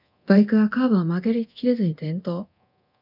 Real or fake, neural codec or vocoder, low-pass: fake; codec, 24 kHz, 0.9 kbps, DualCodec; 5.4 kHz